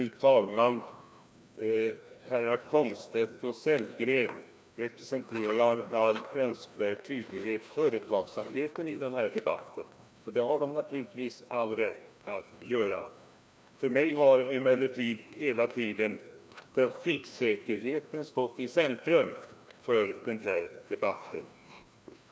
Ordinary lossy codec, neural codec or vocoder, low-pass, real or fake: none; codec, 16 kHz, 1 kbps, FreqCodec, larger model; none; fake